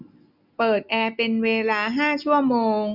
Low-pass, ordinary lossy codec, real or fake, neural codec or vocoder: 5.4 kHz; none; real; none